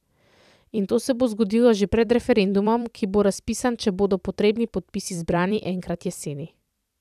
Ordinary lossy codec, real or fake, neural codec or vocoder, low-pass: none; fake; vocoder, 44.1 kHz, 128 mel bands every 256 samples, BigVGAN v2; 14.4 kHz